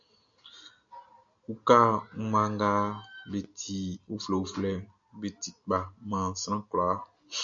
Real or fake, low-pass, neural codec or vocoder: real; 7.2 kHz; none